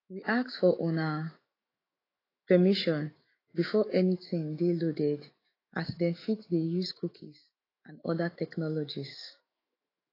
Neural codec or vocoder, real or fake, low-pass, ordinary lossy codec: autoencoder, 48 kHz, 128 numbers a frame, DAC-VAE, trained on Japanese speech; fake; 5.4 kHz; AAC, 24 kbps